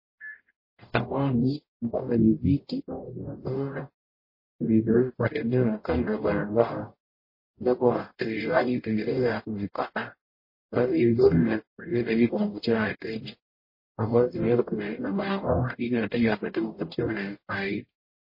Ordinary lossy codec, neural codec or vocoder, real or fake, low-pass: MP3, 24 kbps; codec, 44.1 kHz, 0.9 kbps, DAC; fake; 5.4 kHz